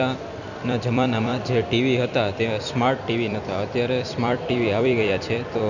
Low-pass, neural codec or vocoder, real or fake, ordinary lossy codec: 7.2 kHz; vocoder, 44.1 kHz, 128 mel bands every 256 samples, BigVGAN v2; fake; none